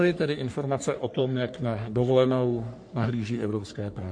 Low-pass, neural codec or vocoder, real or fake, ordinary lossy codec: 9.9 kHz; codec, 44.1 kHz, 3.4 kbps, Pupu-Codec; fake; MP3, 48 kbps